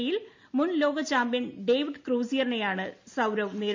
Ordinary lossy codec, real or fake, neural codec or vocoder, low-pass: MP3, 48 kbps; real; none; 7.2 kHz